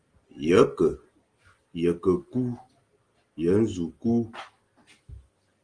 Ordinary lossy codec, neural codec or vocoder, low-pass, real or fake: Opus, 24 kbps; none; 9.9 kHz; real